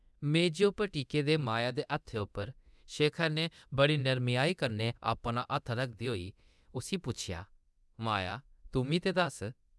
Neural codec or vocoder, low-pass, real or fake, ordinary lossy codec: codec, 24 kHz, 0.9 kbps, DualCodec; none; fake; none